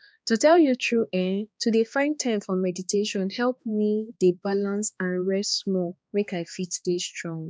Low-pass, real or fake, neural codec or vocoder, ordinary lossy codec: none; fake; codec, 16 kHz, 2 kbps, X-Codec, HuBERT features, trained on balanced general audio; none